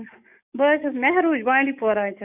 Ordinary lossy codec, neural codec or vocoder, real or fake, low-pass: none; codec, 44.1 kHz, 7.8 kbps, DAC; fake; 3.6 kHz